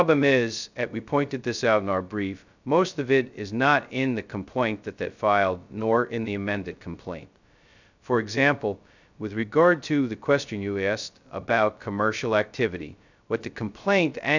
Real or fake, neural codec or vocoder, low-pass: fake; codec, 16 kHz, 0.2 kbps, FocalCodec; 7.2 kHz